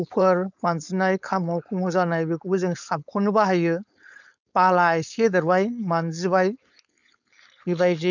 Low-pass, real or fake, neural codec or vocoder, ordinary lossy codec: 7.2 kHz; fake; codec, 16 kHz, 4.8 kbps, FACodec; none